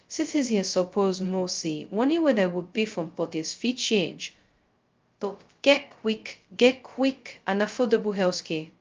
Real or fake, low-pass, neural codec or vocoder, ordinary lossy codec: fake; 7.2 kHz; codec, 16 kHz, 0.2 kbps, FocalCodec; Opus, 24 kbps